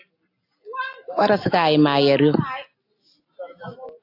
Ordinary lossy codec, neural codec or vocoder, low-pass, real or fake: AAC, 32 kbps; none; 5.4 kHz; real